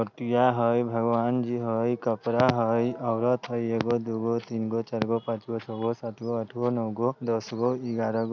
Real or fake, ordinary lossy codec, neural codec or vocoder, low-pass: real; none; none; 7.2 kHz